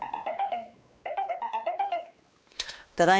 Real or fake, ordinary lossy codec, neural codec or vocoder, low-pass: fake; none; codec, 16 kHz, 2 kbps, X-Codec, WavLM features, trained on Multilingual LibriSpeech; none